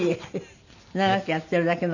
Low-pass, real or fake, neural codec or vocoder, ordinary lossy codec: 7.2 kHz; real; none; none